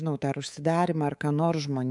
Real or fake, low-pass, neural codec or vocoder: fake; 10.8 kHz; codec, 24 kHz, 3.1 kbps, DualCodec